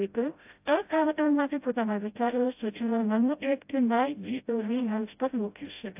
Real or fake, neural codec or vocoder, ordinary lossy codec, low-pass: fake; codec, 16 kHz, 0.5 kbps, FreqCodec, smaller model; AAC, 32 kbps; 3.6 kHz